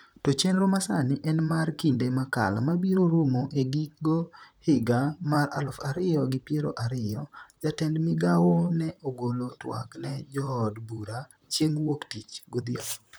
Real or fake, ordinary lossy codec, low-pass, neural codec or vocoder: fake; none; none; vocoder, 44.1 kHz, 128 mel bands, Pupu-Vocoder